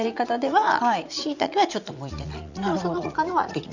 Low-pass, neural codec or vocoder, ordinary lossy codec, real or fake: 7.2 kHz; vocoder, 44.1 kHz, 80 mel bands, Vocos; none; fake